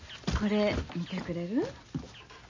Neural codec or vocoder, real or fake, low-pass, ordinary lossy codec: none; real; 7.2 kHz; MP3, 32 kbps